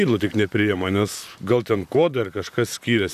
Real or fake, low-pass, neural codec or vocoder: fake; 14.4 kHz; vocoder, 44.1 kHz, 128 mel bands, Pupu-Vocoder